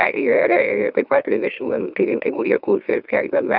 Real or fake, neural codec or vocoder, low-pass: fake; autoencoder, 44.1 kHz, a latent of 192 numbers a frame, MeloTTS; 5.4 kHz